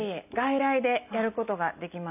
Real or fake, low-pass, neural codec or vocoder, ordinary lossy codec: real; 3.6 kHz; none; none